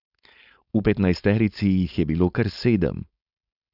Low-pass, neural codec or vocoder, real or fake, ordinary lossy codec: 5.4 kHz; codec, 16 kHz, 4.8 kbps, FACodec; fake; none